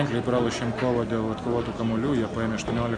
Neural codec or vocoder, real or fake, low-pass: none; real; 9.9 kHz